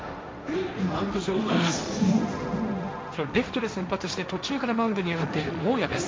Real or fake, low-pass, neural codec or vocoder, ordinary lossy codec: fake; none; codec, 16 kHz, 1.1 kbps, Voila-Tokenizer; none